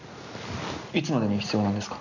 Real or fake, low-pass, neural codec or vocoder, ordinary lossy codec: real; 7.2 kHz; none; none